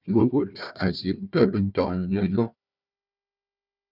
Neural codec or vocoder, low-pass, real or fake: codec, 16 kHz, 1 kbps, FunCodec, trained on Chinese and English, 50 frames a second; 5.4 kHz; fake